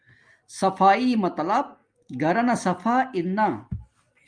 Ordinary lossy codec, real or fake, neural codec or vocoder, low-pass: Opus, 32 kbps; real; none; 9.9 kHz